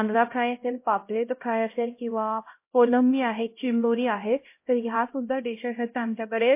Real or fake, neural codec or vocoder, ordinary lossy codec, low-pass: fake; codec, 16 kHz, 0.5 kbps, X-Codec, HuBERT features, trained on LibriSpeech; MP3, 24 kbps; 3.6 kHz